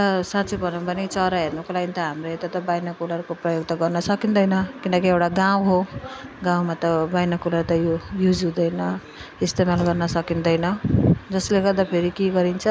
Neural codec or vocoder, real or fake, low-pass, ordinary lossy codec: none; real; none; none